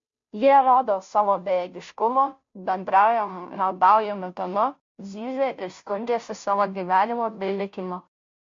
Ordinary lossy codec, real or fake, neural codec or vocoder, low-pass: MP3, 48 kbps; fake; codec, 16 kHz, 0.5 kbps, FunCodec, trained on Chinese and English, 25 frames a second; 7.2 kHz